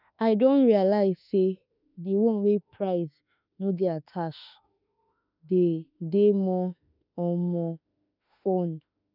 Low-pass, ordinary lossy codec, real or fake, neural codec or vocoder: 5.4 kHz; none; fake; autoencoder, 48 kHz, 32 numbers a frame, DAC-VAE, trained on Japanese speech